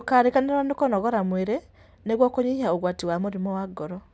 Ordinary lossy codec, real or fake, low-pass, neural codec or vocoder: none; real; none; none